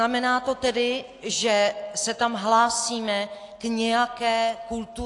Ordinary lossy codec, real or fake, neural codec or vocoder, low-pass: AAC, 48 kbps; real; none; 10.8 kHz